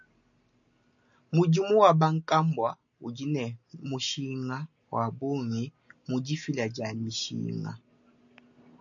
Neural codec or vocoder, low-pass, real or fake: none; 7.2 kHz; real